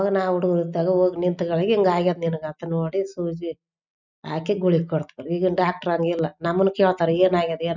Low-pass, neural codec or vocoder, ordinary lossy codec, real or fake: 7.2 kHz; none; none; real